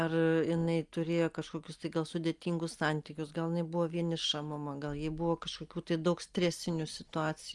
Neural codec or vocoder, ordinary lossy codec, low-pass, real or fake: none; Opus, 32 kbps; 10.8 kHz; real